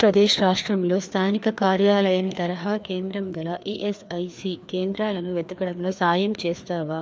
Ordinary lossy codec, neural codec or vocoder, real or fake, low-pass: none; codec, 16 kHz, 2 kbps, FreqCodec, larger model; fake; none